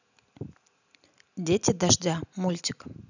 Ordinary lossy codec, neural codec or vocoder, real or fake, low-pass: none; none; real; 7.2 kHz